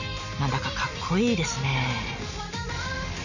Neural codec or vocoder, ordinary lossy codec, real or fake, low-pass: none; none; real; 7.2 kHz